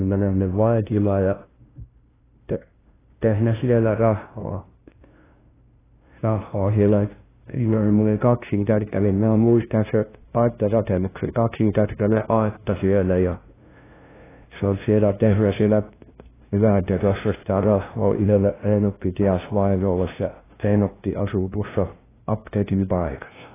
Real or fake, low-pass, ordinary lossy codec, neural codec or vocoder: fake; 3.6 kHz; AAC, 16 kbps; codec, 16 kHz, 0.5 kbps, FunCodec, trained on LibriTTS, 25 frames a second